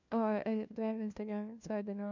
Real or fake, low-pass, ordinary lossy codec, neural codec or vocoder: fake; 7.2 kHz; none; codec, 16 kHz, 1 kbps, FunCodec, trained on LibriTTS, 50 frames a second